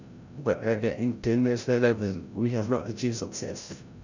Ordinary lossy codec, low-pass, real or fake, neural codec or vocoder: none; 7.2 kHz; fake; codec, 16 kHz, 0.5 kbps, FreqCodec, larger model